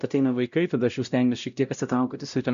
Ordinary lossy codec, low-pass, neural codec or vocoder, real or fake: MP3, 96 kbps; 7.2 kHz; codec, 16 kHz, 0.5 kbps, X-Codec, WavLM features, trained on Multilingual LibriSpeech; fake